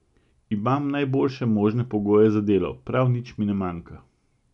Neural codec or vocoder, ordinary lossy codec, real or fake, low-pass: none; none; real; 10.8 kHz